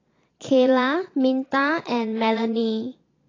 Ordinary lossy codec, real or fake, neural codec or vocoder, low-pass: AAC, 32 kbps; fake; vocoder, 22.05 kHz, 80 mel bands, Vocos; 7.2 kHz